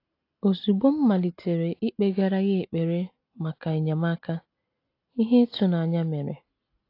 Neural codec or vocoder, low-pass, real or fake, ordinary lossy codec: none; 5.4 kHz; real; AAC, 32 kbps